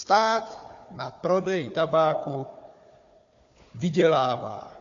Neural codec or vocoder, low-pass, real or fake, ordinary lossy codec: codec, 16 kHz, 4 kbps, FunCodec, trained on Chinese and English, 50 frames a second; 7.2 kHz; fake; AAC, 48 kbps